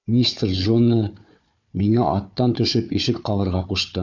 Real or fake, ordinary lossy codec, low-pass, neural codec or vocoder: fake; MP3, 64 kbps; 7.2 kHz; codec, 16 kHz, 4 kbps, FunCodec, trained on Chinese and English, 50 frames a second